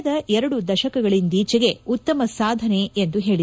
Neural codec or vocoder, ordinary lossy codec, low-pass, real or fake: none; none; none; real